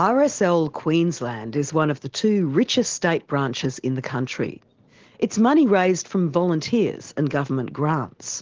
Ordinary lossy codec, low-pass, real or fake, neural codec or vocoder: Opus, 16 kbps; 7.2 kHz; real; none